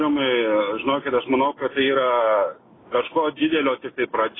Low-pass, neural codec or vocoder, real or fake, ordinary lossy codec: 7.2 kHz; none; real; AAC, 16 kbps